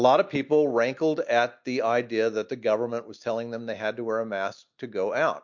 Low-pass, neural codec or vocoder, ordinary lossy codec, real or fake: 7.2 kHz; none; MP3, 48 kbps; real